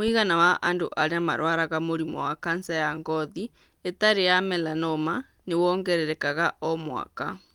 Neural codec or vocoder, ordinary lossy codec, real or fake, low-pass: none; Opus, 32 kbps; real; 19.8 kHz